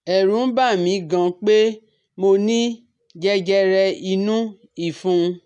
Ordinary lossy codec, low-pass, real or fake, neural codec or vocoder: none; 10.8 kHz; real; none